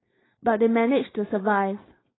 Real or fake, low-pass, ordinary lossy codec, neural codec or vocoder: fake; 7.2 kHz; AAC, 16 kbps; codec, 16 kHz, 4.8 kbps, FACodec